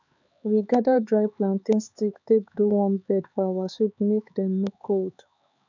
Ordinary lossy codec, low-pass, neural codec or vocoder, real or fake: none; 7.2 kHz; codec, 16 kHz, 4 kbps, X-Codec, HuBERT features, trained on LibriSpeech; fake